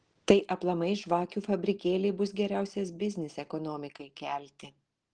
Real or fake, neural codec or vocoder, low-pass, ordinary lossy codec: real; none; 9.9 kHz; Opus, 16 kbps